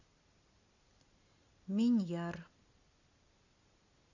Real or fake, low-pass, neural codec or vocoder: real; 7.2 kHz; none